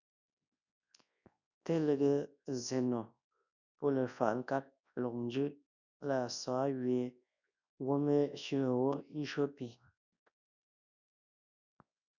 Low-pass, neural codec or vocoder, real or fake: 7.2 kHz; codec, 24 kHz, 0.9 kbps, WavTokenizer, large speech release; fake